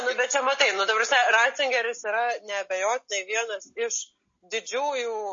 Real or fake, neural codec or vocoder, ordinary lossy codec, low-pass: real; none; MP3, 32 kbps; 7.2 kHz